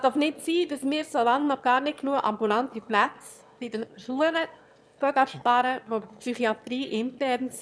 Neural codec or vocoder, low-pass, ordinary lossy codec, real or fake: autoencoder, 22.05 kHz, a latent of 192 numbers a frame, VITS, trained on one speaker; none; none; fake